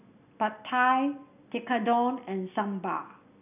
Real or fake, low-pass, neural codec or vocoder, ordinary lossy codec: real; 3.6 kHz; none; none